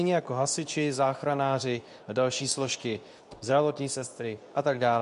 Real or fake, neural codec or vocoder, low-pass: fake; codec, 24 kHz, 0.9 kbps, WavTokenizer, medium speech release version 2; 10.8 kHz